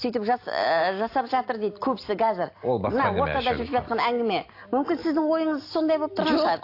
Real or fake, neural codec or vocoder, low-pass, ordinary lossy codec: real; none; 5.4 kHz; AAC, 32 kbps